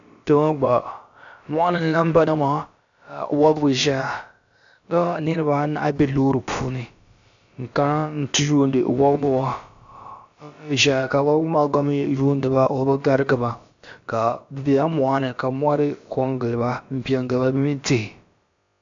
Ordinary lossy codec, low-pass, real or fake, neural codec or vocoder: AAC, 64 kbps; 7.2 kHz; fake; codec, 16 kHz, about 1 kbps, DyCAST, with the encoder's durations